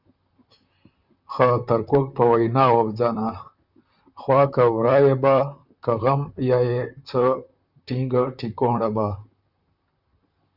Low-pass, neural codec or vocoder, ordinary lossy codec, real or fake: 5.4 kHz; vocoder, 44.1 kHz, 128 mel bands, Pupu-Vocoder; Opus, 64 kbps; fake